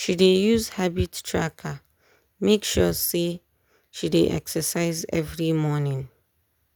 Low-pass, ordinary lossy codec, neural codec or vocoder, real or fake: none; none; none; real